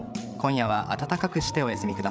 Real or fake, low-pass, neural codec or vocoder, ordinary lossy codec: fake; none; codec, 16 kHz, 8 kbps, FreqCodec, larger model; none